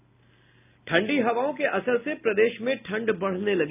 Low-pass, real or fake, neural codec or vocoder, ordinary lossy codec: 3.6 kHz; real; none; none